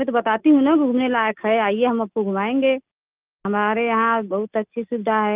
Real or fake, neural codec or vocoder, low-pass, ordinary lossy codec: real; none; 3.6 kHz; Opus, 32 kbps